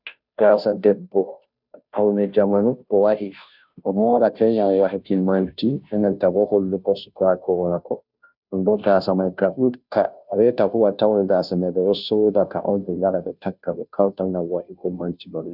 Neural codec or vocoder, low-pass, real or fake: codec, 16 kHz, 0.5 kbps, FunCodec, trained on Chinese and English, 25 frames a second; 5.4 kHz; fake